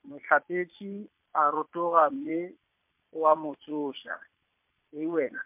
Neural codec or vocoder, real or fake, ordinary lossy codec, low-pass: vocoder, 22.05 kHz, 80 mel bands, Vocos; fake; MP3, 32 kbps; 3.6 kHz